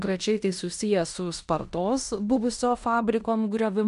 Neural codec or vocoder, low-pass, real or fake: codec, 16 kHz in and 24 kHz out, 0.9 kbps, LongCat-Audio-Codec, fine tuned four codebook decoder; 10.8 kHz; fake